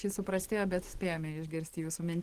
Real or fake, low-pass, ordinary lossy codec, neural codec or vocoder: real; 14.4 kHz; Opus, 16 kbps; none